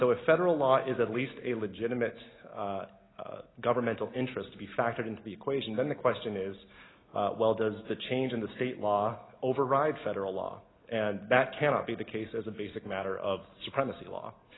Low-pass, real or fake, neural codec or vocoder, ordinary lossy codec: 7.2 kHz; real; none; AAC, 16 kbps